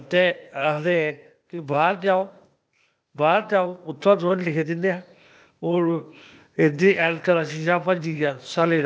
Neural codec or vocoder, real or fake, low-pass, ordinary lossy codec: codec, 16 kHz, 0.8 kbps, ZipCodec; fake; none; none